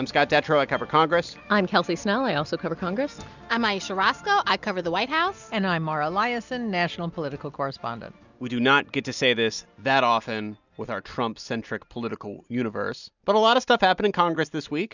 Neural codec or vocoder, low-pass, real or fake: none; 7.2 kHz; real